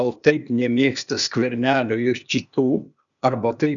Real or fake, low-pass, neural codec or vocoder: fake; 7.2 kHz; codec, 16 kHz, 0.8 kbps, ZipCodec